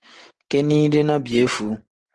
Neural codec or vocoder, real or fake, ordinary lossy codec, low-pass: none; real; Opus, 16 kbps; 9.9 kHz